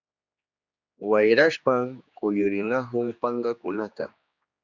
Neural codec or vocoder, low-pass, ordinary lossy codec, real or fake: codec, 16 kHz, 2 kbps, X-Codec, HuBERT features, trained on general audio; 7.2 kHz; Opus, 64 kbps; fake